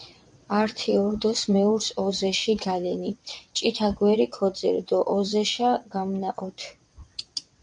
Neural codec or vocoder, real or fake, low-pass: vocoder, 22.05 kHz, 80 mel bands, WaveNeXt; fake; 9.9 kHz